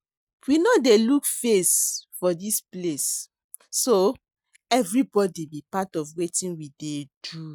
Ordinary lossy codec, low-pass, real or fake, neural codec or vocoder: none; none; real; none